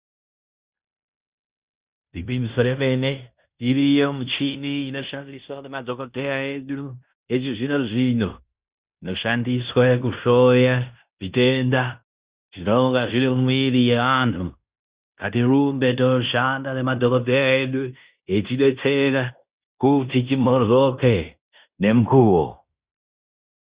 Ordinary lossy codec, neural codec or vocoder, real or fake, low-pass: Opus, 64 kbps; codec, 16 kHz in and 24 kHz out, 0.9 kbps, LongCat-Audio-Codec, fine tuned four codebook decoder; fake; 3.6 kHz